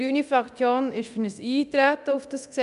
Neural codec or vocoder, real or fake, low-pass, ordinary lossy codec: codec, 24 kHz, 0.9 kbps, DualCodec; fake; 10.8 kHz; none